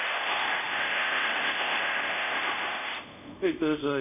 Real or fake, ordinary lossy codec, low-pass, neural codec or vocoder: fake; none; 3.6 kHz; codec, 24 kHz, 0.5 kbps, DualCodec